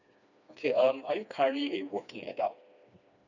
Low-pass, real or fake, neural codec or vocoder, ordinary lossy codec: 7.2 kHz; fake; codec, 16 kHz, 2 kbps, FreqCodec, smaller model; none